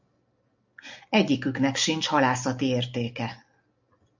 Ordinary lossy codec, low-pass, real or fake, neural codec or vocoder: MP3, 64 kbps; 7.2 kHz; real; none